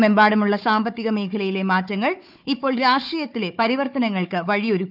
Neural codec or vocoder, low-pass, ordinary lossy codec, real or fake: codec, 16 kHz, 4 kbps, FunCodec, trained on Chinese and English, 50 frames a second; 5.4 kHz; none; fake